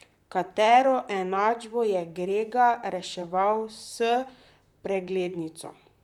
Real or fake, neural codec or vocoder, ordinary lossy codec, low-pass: fake; vocoder, 44.1 kHz, 128 mel bands, Pupu-Vocoder; none; 19.8 kHz